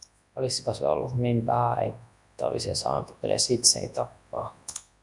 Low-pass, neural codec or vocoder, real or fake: 10.8 kHz; codec, 24 kHz, 0.9 kbps, WavTokenizer, large speech release; fake